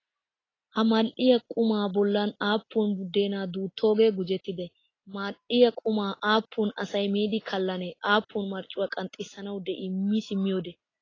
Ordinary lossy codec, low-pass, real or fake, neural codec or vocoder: AAC, 32 kbps; 7.2 kHz; real; none